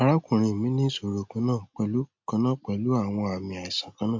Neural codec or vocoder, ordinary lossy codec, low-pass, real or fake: none; MP3, 48 kbps; 7.2 kHz; real